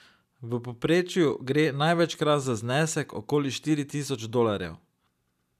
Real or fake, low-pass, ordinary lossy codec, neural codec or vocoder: real; 14.4 kHz; none; none